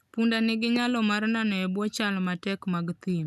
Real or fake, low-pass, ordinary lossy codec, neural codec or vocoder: real; 14.4 kHz; none; none